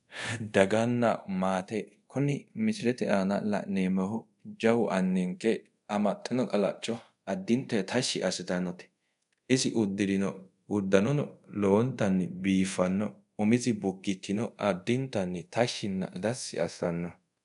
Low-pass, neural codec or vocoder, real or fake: 10.8 kHz; codec, 24 kHz, 0.5 kbps, DualCodec; fake